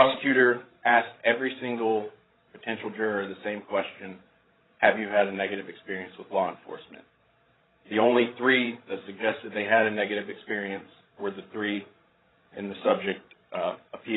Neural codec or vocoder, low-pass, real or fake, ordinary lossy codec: codec, 16 kHz, 16 kbps, FreqCodec, larger model; 7.2 kHz; fake; AAC, 16 kbps